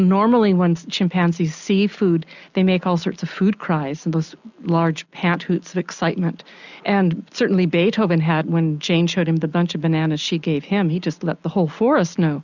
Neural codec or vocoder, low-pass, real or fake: none; 7.2 kHz; real